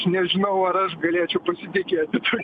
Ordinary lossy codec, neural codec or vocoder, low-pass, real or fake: MP3, 96 kbps; none; 10.8 kHz; real